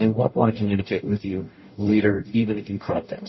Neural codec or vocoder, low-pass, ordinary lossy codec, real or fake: codec, 44.1 kHz, 0.9 kbps, DAC; 7.2 kHz; MP3, 24 kbps; fake